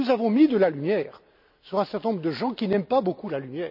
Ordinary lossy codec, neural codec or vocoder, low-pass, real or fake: none; none; 5.4 kHz; real